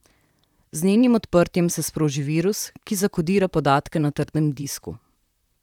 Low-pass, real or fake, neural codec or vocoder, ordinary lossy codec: 19.8 kHz; fake; vocoder, 44.1 kHz, 128 mel bands, Pupu-Vocoder; none